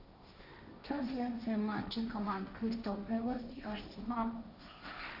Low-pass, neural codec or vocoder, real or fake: 5.4 kHz; codec, 16 kHz, 1.1 kbps, Voila-Tokenizer; fake